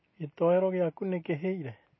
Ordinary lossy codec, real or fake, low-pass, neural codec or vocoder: MP3, 24 kbps; real; 7.2 kHz; none